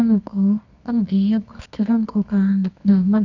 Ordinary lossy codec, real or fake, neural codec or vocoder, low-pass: none; fake; codec, 24 kHz, 0.9 kbps, WavTokenizer, medium music audio release; 7.2 kHz